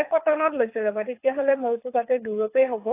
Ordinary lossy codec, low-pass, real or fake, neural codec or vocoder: AAC, 24 kbps; 3.6 kHz; fake; codec, 24 kHz, 6 kbps, HILCodec